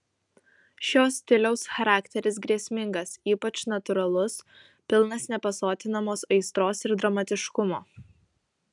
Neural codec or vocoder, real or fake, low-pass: none; real; 10.8 kHz